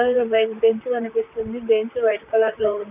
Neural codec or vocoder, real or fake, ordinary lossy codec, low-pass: vocoder, 44.1 kHz, 128 mel bands, Pupu-Vocoder; fake; none; 3.6 kHz